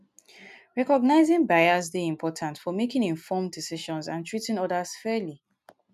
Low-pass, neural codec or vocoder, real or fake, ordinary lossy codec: 14.4 kHz; none; real; none